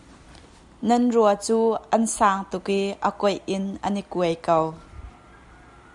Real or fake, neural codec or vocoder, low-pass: real; none; 10.8 kHz